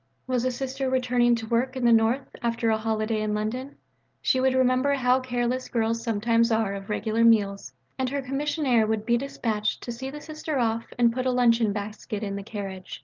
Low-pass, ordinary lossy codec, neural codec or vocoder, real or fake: 7.2 kHz; Opus, 32 kbps; none; real